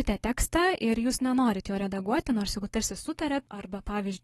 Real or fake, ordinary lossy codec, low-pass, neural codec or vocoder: fake; AAC, 32 kbps; 19.8 kHz; codec, 44.1 kHz, 7.8 kbps, Pupu-Codec